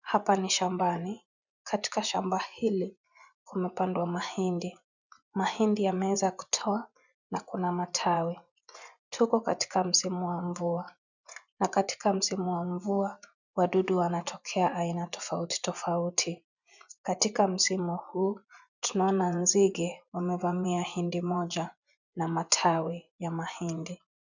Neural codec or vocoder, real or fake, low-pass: vocoder, 24 kHz, 100 mel bands, Vocos; fake; 7.2 kHz